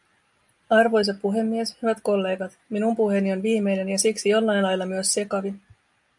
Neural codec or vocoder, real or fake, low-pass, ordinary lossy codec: none; real; 10.8 kHz; MP3, 96 kbps